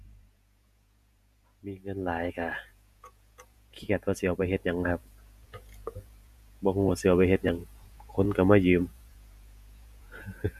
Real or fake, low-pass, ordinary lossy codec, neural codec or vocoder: fake; 14.4 kHz; none; vocoder, 48 kHz, 128 mel bands, Vocos